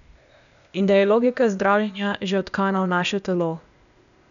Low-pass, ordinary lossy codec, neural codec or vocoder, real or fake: 7.2 kHz; none; codec, 16 kHz, 0.8 kbps, ZipCodec; fake